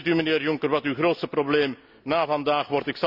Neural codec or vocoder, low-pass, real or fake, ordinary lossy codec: none; 5.4 kHz; real; none